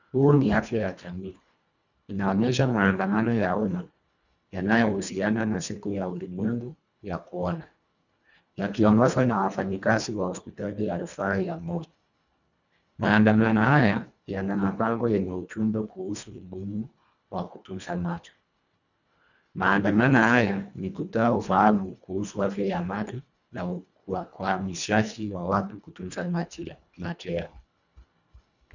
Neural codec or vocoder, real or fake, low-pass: codec, 24 kHz, 1.5 kbps, HILCodec; fake; 7.2 kHz